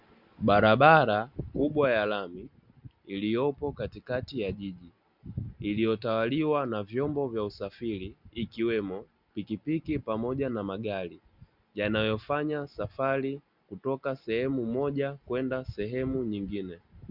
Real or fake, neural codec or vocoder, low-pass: real; none; 5.4 kHz